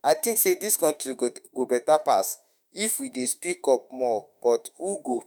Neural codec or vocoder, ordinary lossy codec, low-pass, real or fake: autoencoder, 48 kHz, 32 numbers a frame, DAC-VAE, trained on Japanese speech; none; none; fake